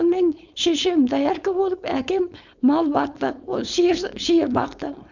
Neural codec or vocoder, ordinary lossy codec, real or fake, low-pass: codec, 16 kHz, 4.8 kbps, FACodec; none; fake; 7.2 kHz